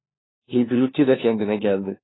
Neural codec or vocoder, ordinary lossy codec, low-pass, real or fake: codec, 16 kHz, 1 kbps, FunCodec, trained on LibriTTS, 50 frames a second; AAC, 16 kbps; 7.2 kHz; fake